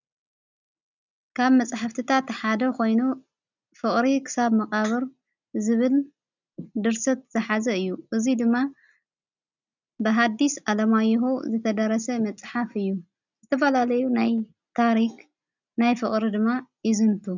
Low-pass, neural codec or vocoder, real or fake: 7.2 kHz; none; real